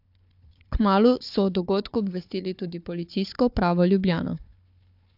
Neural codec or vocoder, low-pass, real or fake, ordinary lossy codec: codec, 16 kHz, 4 kbps, FunCodec, trained on Chinese and English, 50 frames a second; 5.4 kHz; fake; AAC, 48 kbps